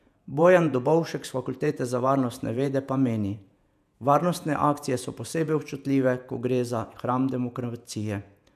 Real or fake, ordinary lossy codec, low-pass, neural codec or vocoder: fake; none; 14.4 kHz; vocoder, 48 kHz, 128 mel bands, Vocos